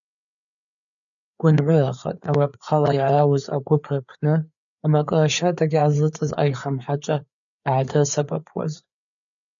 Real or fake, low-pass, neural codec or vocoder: fake; 7.2 kHz; codec, 16 kHz, 4 kbps, FreqCodec, larger model